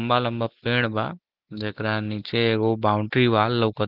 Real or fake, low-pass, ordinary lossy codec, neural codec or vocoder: real; 5.4 kHz; Opus, 16 kbps; none